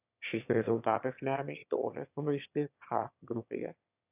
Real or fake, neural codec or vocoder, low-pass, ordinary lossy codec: fake; autoencoder, 22.05 kHz, a latent of 192 numbers a frame, VITS, trained on one speaker; 3.6 kHz; AAC, 32 kbps